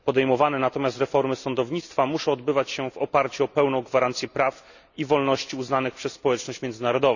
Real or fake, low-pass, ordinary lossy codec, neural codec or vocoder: real; 7.2 kHz; none; none